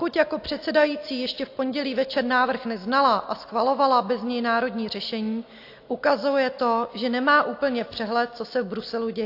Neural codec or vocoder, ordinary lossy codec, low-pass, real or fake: none; AAC, 32 kbps; 5.4 kHz; real